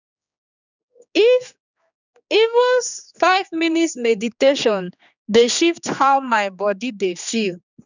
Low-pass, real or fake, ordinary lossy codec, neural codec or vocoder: 7.2 kHz; fake; none; codec, 16 kHz, 2 kbps, X-Codec, HuBERT features, trained on general audio